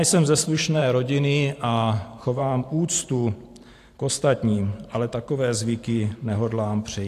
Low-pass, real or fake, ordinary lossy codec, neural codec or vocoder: 14.4 kHz; fake; AAC, 64 kbps; vocoder, 44.1 kHz, 128 mel bands every 512 samples, BigVGAN v2